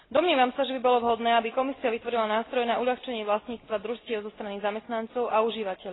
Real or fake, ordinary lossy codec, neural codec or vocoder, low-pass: real; AAC, 16 kbps; none; 7.2 kHz